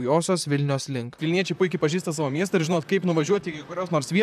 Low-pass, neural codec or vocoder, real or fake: 14.4 kHz; vocoder, 44.1 kHz, 128 mel bands, Pupu-Vocoder; fake